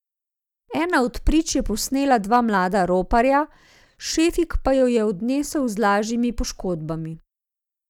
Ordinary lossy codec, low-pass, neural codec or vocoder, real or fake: none; 19.8 kHz; none; real